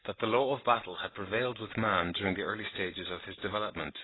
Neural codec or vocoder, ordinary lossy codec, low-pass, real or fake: none; AAC, 16 kbps; 7.2 kHz; real